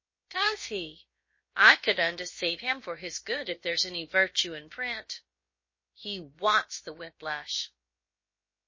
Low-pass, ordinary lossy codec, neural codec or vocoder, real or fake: 7.2 kHz; MP3, 32 kbps; codec, 16 kHz, about 1 kbps, DyCAST, with the encoder's durations; fake